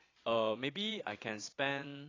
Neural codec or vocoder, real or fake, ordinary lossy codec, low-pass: vocoder, 22.05 kHz, 80 mel bands, Vocos; fake; AAC, 32 kbps; 7.2 kHz